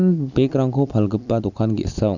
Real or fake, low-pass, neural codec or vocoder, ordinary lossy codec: real; 7.2 kHz; none; none